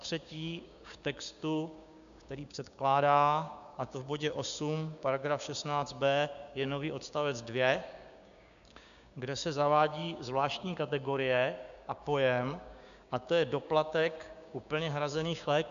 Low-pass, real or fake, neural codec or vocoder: 7.2 kHz; fake; codec, 16 kHz, 6 kbps, DAC